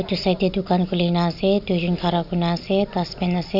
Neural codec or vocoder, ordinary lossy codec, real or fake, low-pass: none; none; real; 5.4 kHz